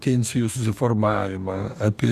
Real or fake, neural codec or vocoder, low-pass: fake; codec, 44.1 kHz, 2.6 kbps, DAC; 14.4 kHz